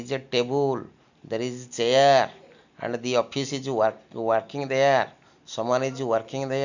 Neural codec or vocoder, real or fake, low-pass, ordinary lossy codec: none; real; 7.2 kHz; none